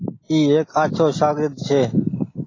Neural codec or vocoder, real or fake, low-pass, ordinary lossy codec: none; real; 7.2 kHz; AAC, 32 kbps